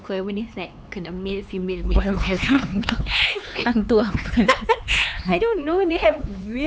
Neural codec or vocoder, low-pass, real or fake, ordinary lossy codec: codec, 16 kHz, 4 kbps, X-Codec, HuBERT features, trained on LibriSpeech; none; fake; none